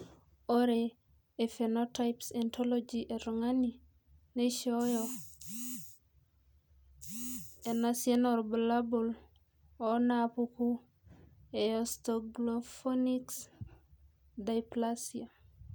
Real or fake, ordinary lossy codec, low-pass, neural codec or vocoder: real; none; none; none